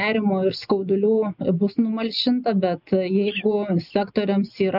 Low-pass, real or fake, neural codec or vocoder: 5.4 kHz; real; none